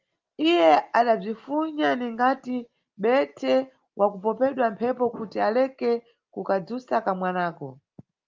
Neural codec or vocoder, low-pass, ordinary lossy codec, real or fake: none; 7.2 kHz; Opus, 24 kbps; real